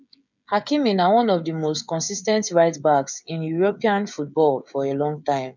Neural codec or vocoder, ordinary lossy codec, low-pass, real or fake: codec, 16 kHz, 16 kbps, FreqCodec, smaller model; none; 7.2 kHz; fake